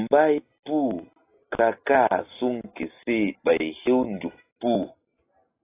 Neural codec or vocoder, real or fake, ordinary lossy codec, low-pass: none; real; AAC, 24 kbps; 3.6 kHz